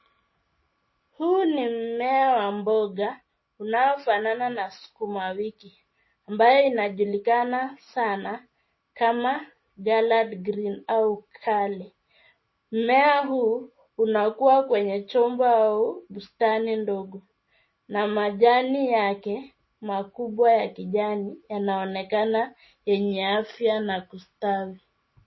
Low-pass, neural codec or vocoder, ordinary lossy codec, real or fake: 7.2 kHz; none; MP3, 24 kbps; real